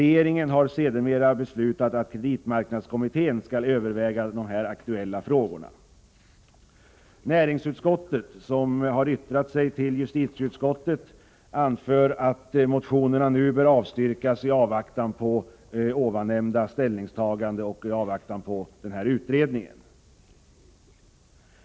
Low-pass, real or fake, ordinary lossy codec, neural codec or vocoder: none; real; none; none